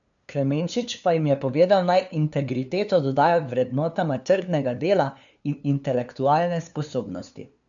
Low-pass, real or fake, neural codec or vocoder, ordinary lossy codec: 7.2 kHz; fake; codec, 16 kHz, 2 kbps, FunCodec, trained on LibriTTS, 25 frames a second; none